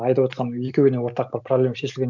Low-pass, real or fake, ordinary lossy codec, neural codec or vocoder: 7.2 kHz; real; none; none